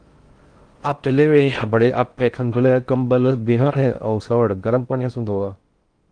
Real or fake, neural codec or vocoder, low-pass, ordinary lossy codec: fake; codec, 16 kHz in and 24 kHz out, 0.6 kbps, FocalCodec, streaming, 4096 codes; 9.9 kHz; Opus, 24 kbps